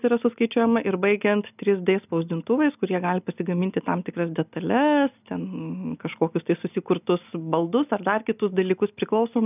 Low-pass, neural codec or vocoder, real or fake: 3.6 kHz; none; real